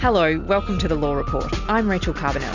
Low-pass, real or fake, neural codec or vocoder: 7.2 kHz; real; none